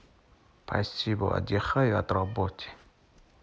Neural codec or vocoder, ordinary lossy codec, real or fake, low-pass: none; none; real; none